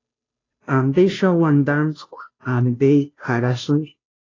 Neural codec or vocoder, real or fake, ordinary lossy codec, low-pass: codec, 16 kHz, 0.5 kbps, FunCodec, trained on Chinese and English, 25 frames a second; fake; AAC, 32 kbps; 7.2 kHz